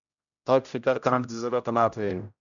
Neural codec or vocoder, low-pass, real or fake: codec, 16 kHz, 0.5 kbps, X-Codec, HuBERT features, trained on general audio; 7.2 kHz; fake